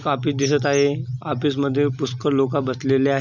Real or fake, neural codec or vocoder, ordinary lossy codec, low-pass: real; none; none; 7.2 kHz